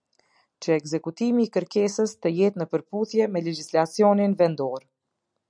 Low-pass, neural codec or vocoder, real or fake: 9.9 kHz; none; real